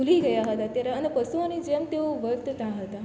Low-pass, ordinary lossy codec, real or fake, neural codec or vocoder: none; none; real; none